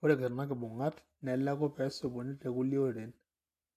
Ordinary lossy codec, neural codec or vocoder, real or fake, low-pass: AAC, 64 kbps; none; real; 14.4 kHz